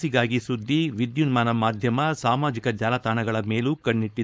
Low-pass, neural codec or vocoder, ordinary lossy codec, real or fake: none; codec, 16 kHz, 4.8 kbps, FACodec; none; fake